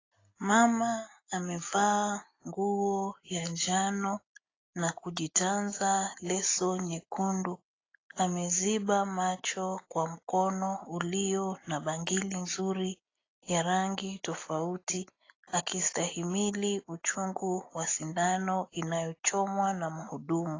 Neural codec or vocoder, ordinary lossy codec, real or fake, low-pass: none; AAC, 32 kbps; real; 7.2 kHz